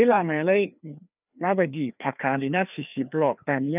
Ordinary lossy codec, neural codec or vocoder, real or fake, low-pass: none; codec, 16 kHz, 2 kbps, FreqCodec, larger model; fake; 3.6 kHz